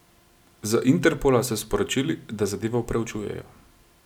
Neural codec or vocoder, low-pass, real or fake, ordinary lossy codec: none; 19.8 kHz; real; none